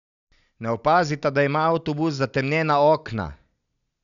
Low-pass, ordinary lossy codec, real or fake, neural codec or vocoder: 7.2 kHz; none; real; none